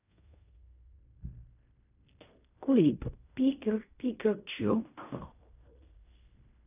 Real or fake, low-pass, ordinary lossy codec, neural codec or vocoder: fake; 3.6 kHz; none; codec, 16 kHz in and 24 kHz out, 0.4 kbps, LongCat-Audio-Codec, fine tuned four codebook decoder